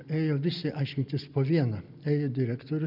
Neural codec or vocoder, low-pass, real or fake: none; 5.4 kHz; real